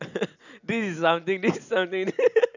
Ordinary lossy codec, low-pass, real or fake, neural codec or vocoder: none; 7.2 kHz; real; none